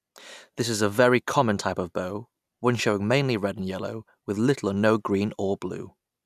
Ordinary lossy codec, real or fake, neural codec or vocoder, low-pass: none; real; none; 14.4 kHz